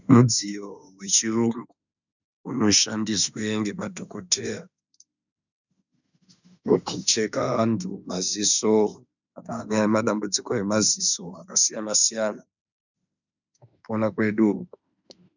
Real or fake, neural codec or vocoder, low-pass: fake; autoencoder, 48 kHz, 32 numbers a frame, DAC-VAE, trained on Japanese speech; 7.2 kHz